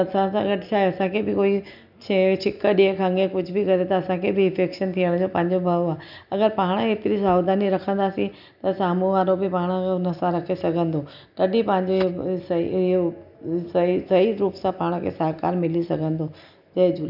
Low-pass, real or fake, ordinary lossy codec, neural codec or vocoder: 5.4 kHz; real; none; none